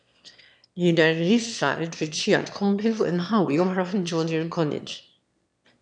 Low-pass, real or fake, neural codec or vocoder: 9.9 kHz; fake; autoencoder, 22.05 kHz, a latent of 192 numbers a frame, VITS, trained on one speaker